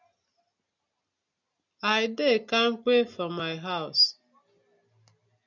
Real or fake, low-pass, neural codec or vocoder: real; 7.2 kHz; none